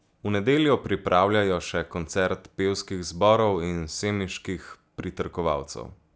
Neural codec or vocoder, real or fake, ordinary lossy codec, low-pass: none; real; none; none